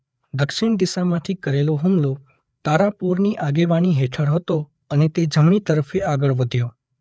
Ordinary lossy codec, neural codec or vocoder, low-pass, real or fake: none; codec, 16 kHz, 4 kbps, FreqCodec, larger model; none; fake